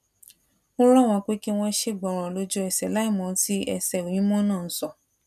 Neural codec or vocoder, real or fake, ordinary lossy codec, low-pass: none; real; none; 14.4 kHz